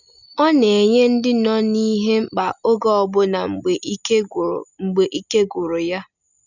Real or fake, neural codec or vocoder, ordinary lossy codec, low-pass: real; none; none; 7.2 kHz